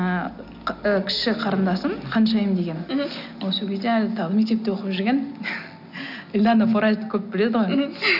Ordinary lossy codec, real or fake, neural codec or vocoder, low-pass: none; real; none; 5.4 kHz